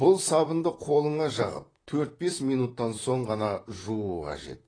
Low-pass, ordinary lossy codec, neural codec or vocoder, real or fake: 9.9 kHz; AAC, 32 kbps; vocoder, 44.1 kHz, 128 mel bands, Pupu-Vocoder; fake